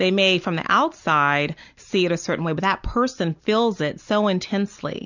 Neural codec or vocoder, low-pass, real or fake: none; 7.2 kHz; real